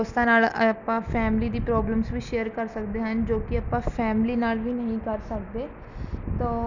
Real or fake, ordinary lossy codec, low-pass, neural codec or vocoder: real; none; 7.2 kHz; none